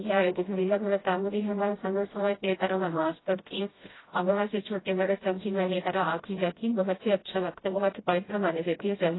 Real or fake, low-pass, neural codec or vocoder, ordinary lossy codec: fake; 7.2 kHz; codec, 16 kHz, 0.5 kbps, FreqCodec, smaller model; AAC, 16 kbps